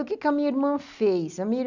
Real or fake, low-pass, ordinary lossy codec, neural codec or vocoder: real; 7.2 kHz; none; none